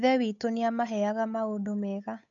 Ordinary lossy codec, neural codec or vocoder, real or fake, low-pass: none; codec, 16 kHz, 8 kbps, FunCodec, trained on Chinese and English, 25 frames a second; fake; 7.2 kHz